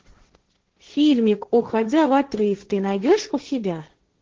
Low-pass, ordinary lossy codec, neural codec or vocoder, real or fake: 7.2 kHz; Opus, 16 kbps; codec, 16 kHz, 1.1 kbps, Voila-Tokenizer; fake